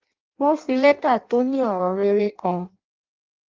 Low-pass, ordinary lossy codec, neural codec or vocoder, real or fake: 7.2 kHz; Opus, 32 kbps; codec, 16 kHz in and 24 kHz out, 0.6 kbps, FireRedTTS-2 codec; fake